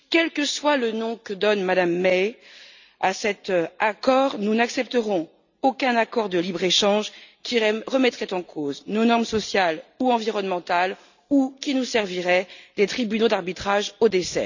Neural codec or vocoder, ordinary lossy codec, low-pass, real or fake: none; none; 7.2 kHz; real